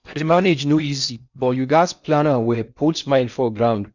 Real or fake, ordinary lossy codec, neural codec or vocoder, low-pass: fake; none; codec, 16 kHz in and 24 kHz out, 0.6 kbps, FocalCodec, streaming, 4096 codes; 7.2 kHz